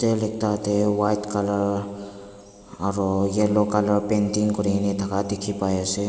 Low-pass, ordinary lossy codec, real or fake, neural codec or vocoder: none; none; real; none